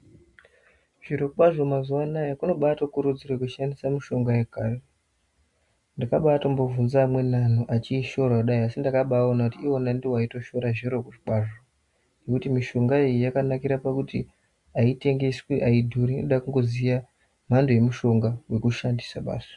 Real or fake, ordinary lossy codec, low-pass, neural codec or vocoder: real; MP3, 64 kbps; 10.8 kHz; none